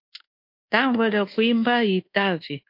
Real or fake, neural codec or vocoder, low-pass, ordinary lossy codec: fake; codec, 24 kHz, 1.2 kbps, DualCodec; 5.4 kHz; MP3, 32 kbps